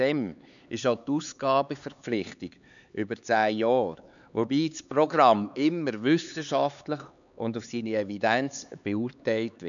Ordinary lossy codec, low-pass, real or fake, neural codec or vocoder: none; 7.2 kHz; fake; codec, 16 kHz, 4 kbps, X-Codec, HuBERT features, trained on LibriSpeech